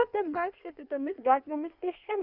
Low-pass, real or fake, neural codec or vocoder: 5.4 kHz; fake; codec, 16 kHz in and 24 kHz out, 1.1 kbps, FireRedTTS-2 codec